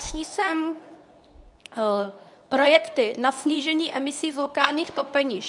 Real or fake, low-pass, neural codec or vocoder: fake; 10.8 kHz; codec, 24 kHz, 0.9 kbps, WavTokenizer, medium speech release version 2